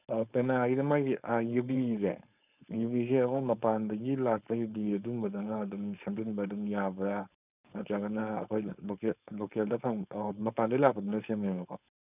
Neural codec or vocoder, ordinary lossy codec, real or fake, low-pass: codec, 16 kHz, 4.8 kbps, FACodec; none; fake; 3.6 kHz